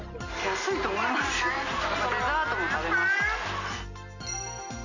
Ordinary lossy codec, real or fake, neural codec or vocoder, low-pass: none; real; none; 7.2 kHz